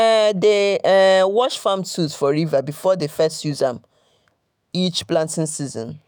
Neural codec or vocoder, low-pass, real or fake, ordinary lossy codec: autoencoder, 48 kHz, 128 numbers a frame, DAC-VAE, trained on Japanese speech; none; fake; none